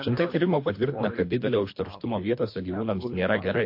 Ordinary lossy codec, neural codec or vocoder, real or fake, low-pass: MP3, 32 kbps; codec, 24 kHz, 3 kbps, HILCodec; fake; 5.4 kHz